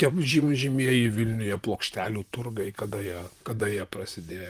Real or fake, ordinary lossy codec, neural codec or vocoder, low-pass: fake; Opus, 32 kbps; vocoder, 44.1 kHz, 128 mel bands, Pupu-Vocoder; 14.4 kHz